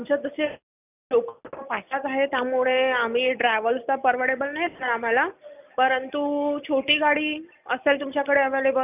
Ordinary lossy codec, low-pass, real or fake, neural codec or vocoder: none; 3.6 kHz; real; none